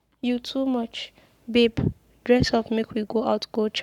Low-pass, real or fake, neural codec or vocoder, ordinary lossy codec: 19.8 kHz; fake; codec, 44.1 kHz, 7.8 kbps, Pupu-Codec; none